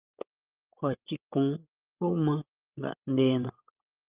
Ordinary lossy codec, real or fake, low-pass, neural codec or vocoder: Opus, 24 kbps; fake; 3.6 kHz; codec, 16 kHz, 16 kbps, FreqCodec, larger model